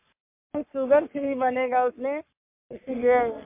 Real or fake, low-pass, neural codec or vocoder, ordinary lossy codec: fake; 3.6 kHz; codec, 44.1 kHz, 3.4 kbps, Pupu-Codec; MP3, 32 kbps